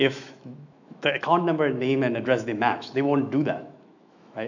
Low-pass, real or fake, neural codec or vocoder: 7.2 kHz; fake; autoencoder, 48 kHz, 128 numbers a frame, DAC-VAE, trained on Japanese speech